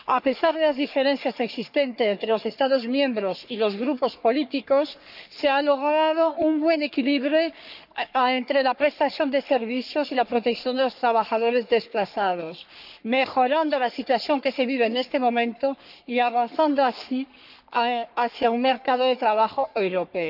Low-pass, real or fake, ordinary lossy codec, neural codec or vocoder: 5.4 kHz; fake; none; codec, 44.1 kHz, 3.4 kbps, Pupu-Codec